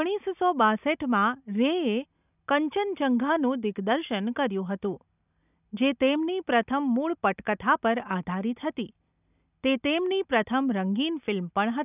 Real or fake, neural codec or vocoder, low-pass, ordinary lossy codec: real; none; 3.6 kHz; none